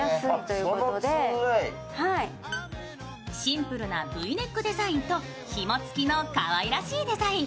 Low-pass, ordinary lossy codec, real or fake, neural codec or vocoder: none; none; real; none